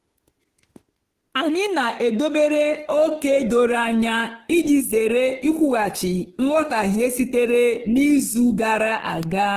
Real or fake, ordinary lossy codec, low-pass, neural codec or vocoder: fake; Opus, 16 kbps; 14.4 kHz; autoencoder, 48 kHz, 32 numbers a frame, DAC-VAE, trained on Japanese speech